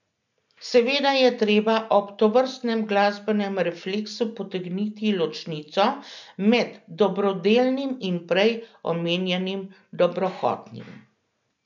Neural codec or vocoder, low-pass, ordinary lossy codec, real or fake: none; 7.2 kHz; none; real